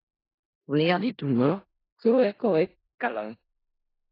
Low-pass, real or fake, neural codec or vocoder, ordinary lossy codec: 5.4 kHz; fake; codec, 16 kHz in and 24 kHz out, 0.4 kbps, LongCat-Audio-Codec, four codebook decoder; AAC, 24 kbps